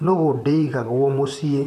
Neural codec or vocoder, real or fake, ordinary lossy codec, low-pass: vocoder, 44.1 kHz, 128 mel bands, Pupu-Vocoder; fake; none; 14.4 kHz